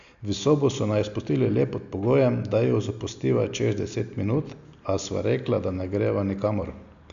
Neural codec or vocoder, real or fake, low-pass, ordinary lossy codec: none; real; 7.2 kHz; none